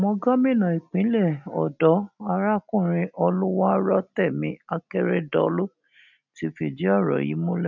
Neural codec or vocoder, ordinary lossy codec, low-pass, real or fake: none; none; 7.2 kHz; real